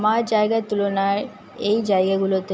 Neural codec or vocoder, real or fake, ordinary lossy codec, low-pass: none; real; none; none